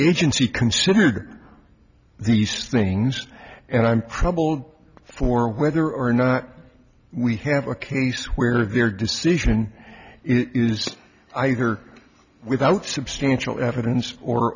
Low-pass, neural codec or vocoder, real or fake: 7.2 kHz; none; real